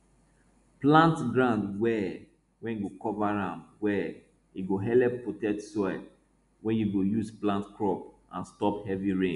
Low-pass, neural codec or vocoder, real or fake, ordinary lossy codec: 10.8 kHz; none; real; none